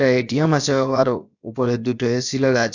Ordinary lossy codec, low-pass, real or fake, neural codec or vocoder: none; 7.2 kHz; fake; codec, 16 kHz, about 1 kbps, DyCAST, with the encoder's durations